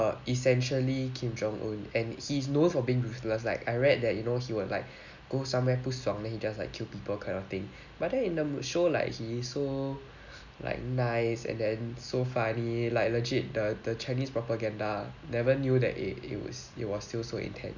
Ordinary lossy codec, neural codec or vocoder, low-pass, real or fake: none; none; 7.2 kHz; real